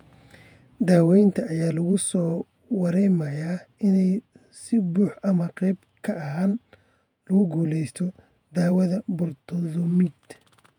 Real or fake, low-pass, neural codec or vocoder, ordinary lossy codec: fake; 19.8 kHz; vocoder, 48 kHz, 128 mel bands, Vocos; none